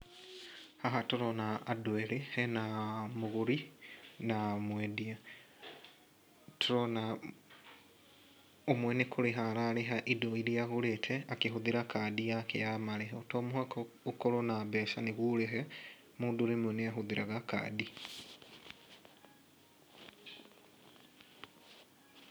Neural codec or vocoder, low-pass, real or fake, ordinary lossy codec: none; none; real; none